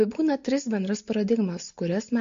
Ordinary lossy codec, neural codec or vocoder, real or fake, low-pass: AAC, 64 kbps; none; real; 7.2 kHz